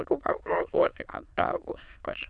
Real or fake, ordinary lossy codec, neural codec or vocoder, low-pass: fake; MP3, 96 kbps; autoencoder, 22.05 kHz, a latent of 192 numbers a frame, VITS, trained on many speakers; 9.9 kHz